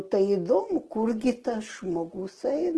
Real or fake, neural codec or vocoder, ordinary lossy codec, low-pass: real; none; Opus, 16 kbps; 10.8 kHz